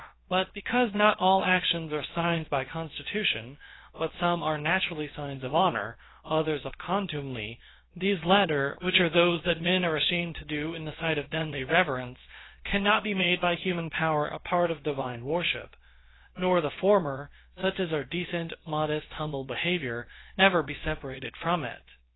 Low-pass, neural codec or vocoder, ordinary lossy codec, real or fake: 7.2 kHz; codec, 16 kHz, about 1 kbps, DyCAST, with the encoder's durations; AAC, 16 kbps; fake